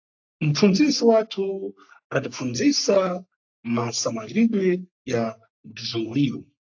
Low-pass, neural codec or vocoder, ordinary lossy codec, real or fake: 7.2 kHz; codec, 44.1 kHz, 3.4 kbps, Pupu-Codec; AAC, 48 kbps; fake